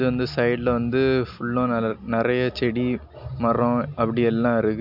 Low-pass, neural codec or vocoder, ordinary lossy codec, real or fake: 5.4 kHz; none; MP3, 48 kbps; real